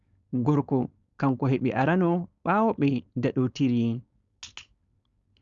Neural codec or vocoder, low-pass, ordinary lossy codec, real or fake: codec, 16 kHz, 4.8 kbps, FACodec; 7.2 kHz; Opus, 64 kbps; fake